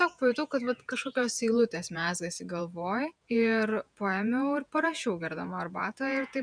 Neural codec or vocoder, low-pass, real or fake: vocoder, 48 kHz, 128 mel bands, Vocos; 9.9 kHz; fake